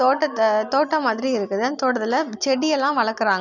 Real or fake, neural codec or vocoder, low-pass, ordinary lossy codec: real; none; 7.2 kHz; none